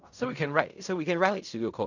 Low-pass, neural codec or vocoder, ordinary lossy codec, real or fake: 7.2 kHz; codec, 16 kHz in and 24 kHz out, 0.4 kbps, LongCat-Audio-Codec, fine tuned four codebook decoder; none; fake